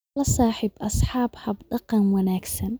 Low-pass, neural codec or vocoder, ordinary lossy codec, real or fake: none; none; none; real